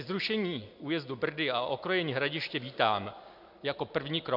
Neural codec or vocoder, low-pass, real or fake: none; 5.4 kHz; real